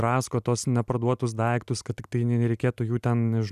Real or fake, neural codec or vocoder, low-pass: real; none; 14.4 kHz